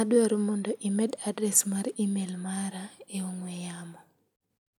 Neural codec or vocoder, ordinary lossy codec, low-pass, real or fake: none; none; 19.8 kHz; real